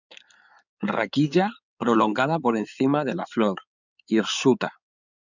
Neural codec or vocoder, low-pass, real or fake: codec, 16 kHz in and 24 kHz out, 2.2 kbps, FireRedTTS-2 codec; 7.2 kHz; fake